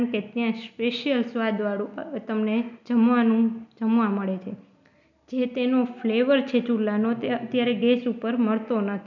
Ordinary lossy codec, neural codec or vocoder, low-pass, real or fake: none; none; 7.2 kHz; real